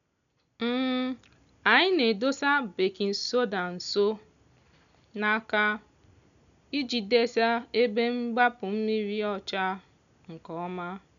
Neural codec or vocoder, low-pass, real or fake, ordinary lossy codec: none; 7.2 kHz; real; none